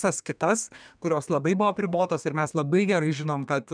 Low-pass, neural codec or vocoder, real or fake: 9.9 kHz; codec, 32 kHz, 1.9 kbps, SNAC; fake